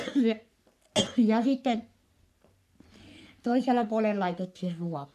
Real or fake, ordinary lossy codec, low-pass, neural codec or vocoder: fake; none; 14.4 kHz; codec, 44.1 kHz, 3.4 kbps, Pupu-Codec